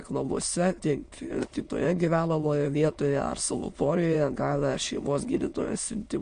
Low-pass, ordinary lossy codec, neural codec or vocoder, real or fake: 9.9 kHz; MP3, 48 kbps; autoencoder, 22.05 kHz, a latent of 192 numbers a frame, VITS, trained on many speakers; fake